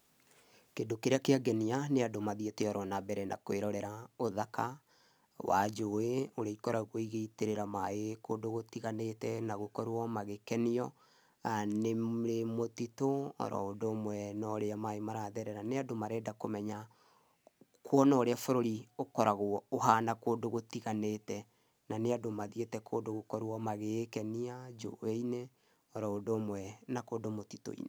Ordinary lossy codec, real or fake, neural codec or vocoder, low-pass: none; real; none; none